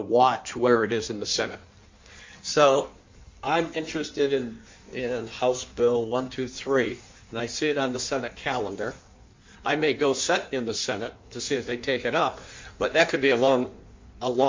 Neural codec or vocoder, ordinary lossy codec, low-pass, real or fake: codec, 16 kHz in and 24 kHz out, 1.1 kbps, FireRedTTS-2 codec; MP3, 48 kbps; 7.2 kHz; fake